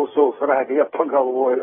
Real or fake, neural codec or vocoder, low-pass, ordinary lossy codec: fake; codec, 16 kHz, 8 kbps, FreqCodec, smaller model; 7.2 kHz; AAC, 16 kbps